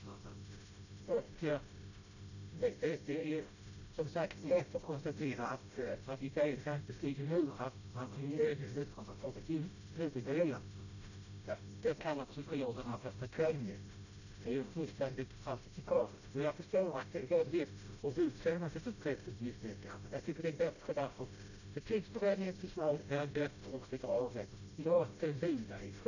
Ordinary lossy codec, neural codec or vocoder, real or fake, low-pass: none; codec, 16 kHz, 0.5 kbps, FreqCodec, smaller model; fake; 7.2 kHz